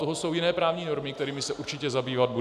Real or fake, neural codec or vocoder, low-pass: real; none; 14.4 kHz